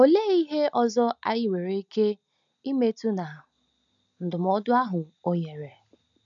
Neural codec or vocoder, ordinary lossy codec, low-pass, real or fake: none; none; 7.2 kHz; real